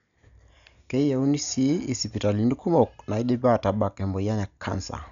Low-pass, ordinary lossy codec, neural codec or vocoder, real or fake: 7.2 kHz; none; none; real